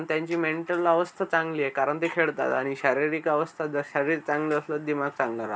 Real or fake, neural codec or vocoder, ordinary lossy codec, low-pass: real; none; none; none